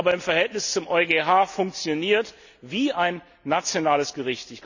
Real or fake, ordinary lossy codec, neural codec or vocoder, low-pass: real; none; none; 7.2 kHz